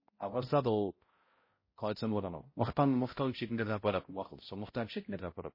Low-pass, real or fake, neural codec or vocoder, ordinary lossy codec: 5.4 kHz; fake; codec, 16 kHz, 0.5 kbps, X-Codec, HuBERT features, trained on balanced general audio; MP3, 24 kbps